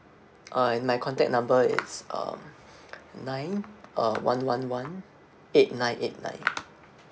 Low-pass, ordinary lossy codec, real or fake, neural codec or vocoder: none; none; real; none